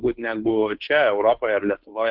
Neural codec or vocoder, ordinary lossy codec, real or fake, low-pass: codec, 16 kHz, 2 kbps, FunCodec, trained on Chinese and English, 25 frames a second; Opus, 24 kbps; fake; 5.4 kHz